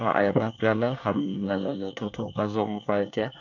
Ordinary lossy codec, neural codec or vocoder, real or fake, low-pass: AAC, 48 kbps; codec, 24 kHz, 1 kbps, SNAC; fake; 7.2 kHz